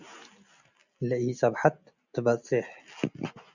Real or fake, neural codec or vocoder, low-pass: real; none; 7.2 kHz